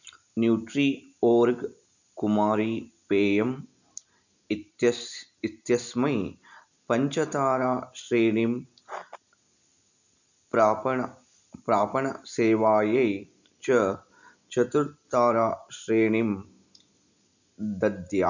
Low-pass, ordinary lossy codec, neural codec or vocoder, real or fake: 7.2 kHz; none; none; real